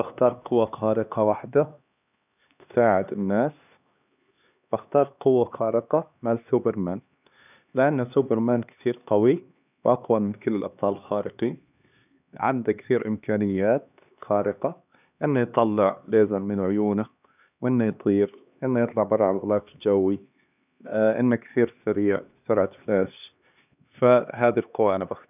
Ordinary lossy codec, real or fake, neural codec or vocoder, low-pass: none; fake; codec, 16 kHz, 2 kbps, X-Codec, HuBERT features, trained on LibriSpeech; 3.6 kHz